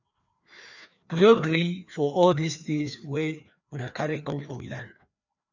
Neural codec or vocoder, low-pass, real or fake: codec, 16 kHz, 2 kbps, FreqCodec, larger model; 7.2 kHz; fake